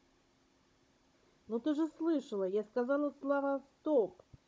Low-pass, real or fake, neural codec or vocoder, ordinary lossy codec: none; fake; codec, 16 kHz, 16 kbps, FunCodec, trained on Chinese and English, 50 frames a second; none